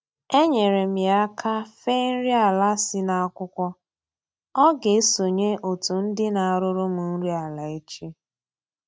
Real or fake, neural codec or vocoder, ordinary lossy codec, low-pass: real; none; none; none